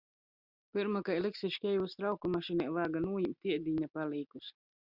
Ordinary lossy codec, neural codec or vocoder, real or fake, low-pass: Opus, 64 kbps; none; real; 5.4 kHz